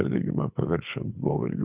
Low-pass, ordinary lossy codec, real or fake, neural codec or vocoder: 3.6 kHz; Opus, 64 kbps; fake; codec, 24 kHz, 6 kbps, HILCodec